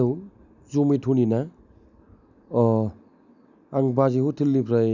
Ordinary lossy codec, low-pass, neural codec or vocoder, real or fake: none; 7.2 kHz; none; real